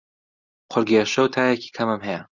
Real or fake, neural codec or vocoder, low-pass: real; none; 7.2 kHz